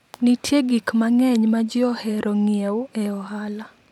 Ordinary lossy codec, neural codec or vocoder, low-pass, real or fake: none; vocoder, 44.1 kHz, 128 mel bands every 512 samples, BigVGAN v2; 19.8 kHz; fake